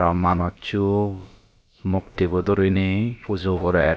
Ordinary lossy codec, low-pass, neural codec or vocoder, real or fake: none; none; codec, 16 kHz, about 1 kbps, DyCAST, with the encoder's durations; fake